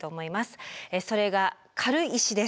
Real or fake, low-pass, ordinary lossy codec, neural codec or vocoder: real; none; none; none